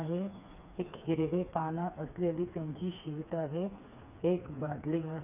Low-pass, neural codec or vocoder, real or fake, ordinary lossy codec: 3.6 kHz; codec, 16 kHz, 4 kbps, FreqCodec, smaller model; fake; none